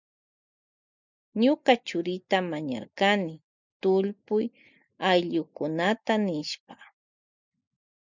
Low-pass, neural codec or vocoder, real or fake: 7.2 kHz; none; real